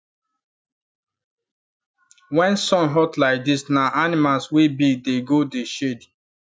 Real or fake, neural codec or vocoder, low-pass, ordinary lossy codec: real; none; none; none